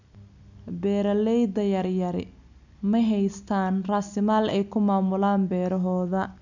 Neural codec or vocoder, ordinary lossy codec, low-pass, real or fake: none; none; 7.2 kHz; real